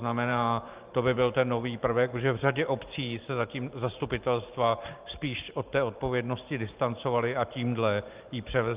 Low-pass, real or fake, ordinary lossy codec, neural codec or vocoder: 3.6 kHz; fake; Opus, 24 kbps; vocoder, 44.1 kHz, 128 mel bands every 512 samples, BigVGAN v2